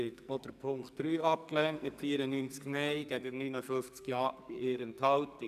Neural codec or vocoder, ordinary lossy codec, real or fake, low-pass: codec, 44.1 kHz, 2.6 kbps, SNAC; none; fake; 14.4 kHz